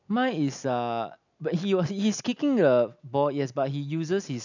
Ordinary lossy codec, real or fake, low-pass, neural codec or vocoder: none; real; 7.2 kHz; none